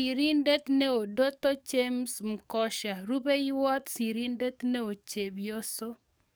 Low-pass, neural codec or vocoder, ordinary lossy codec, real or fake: none; codec, 44.1 kHz, 7.8 kbps, DAC; none; fake